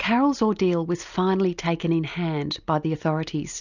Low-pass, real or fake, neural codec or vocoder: 7.2 kHz; real; none